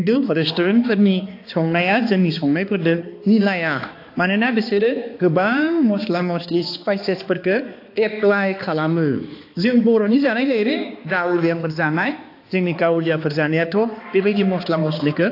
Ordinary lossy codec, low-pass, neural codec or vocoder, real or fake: AAC, 32 kbps; 5.4 kHz; codec, 16 kHz, 2 kbps, X-Codec, HuBERT features, trained on balanced general audio; fake